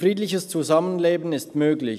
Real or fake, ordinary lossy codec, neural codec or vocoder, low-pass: real; none; none; 14.4 kHz